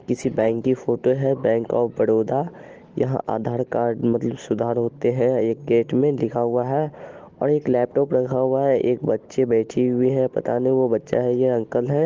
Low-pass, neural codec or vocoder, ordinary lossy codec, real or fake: 7.2 kHz; none; Opus, 16 kbps; real